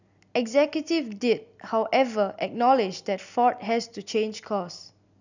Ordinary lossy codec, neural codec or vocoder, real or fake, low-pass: none; none; real; 7.2 kHz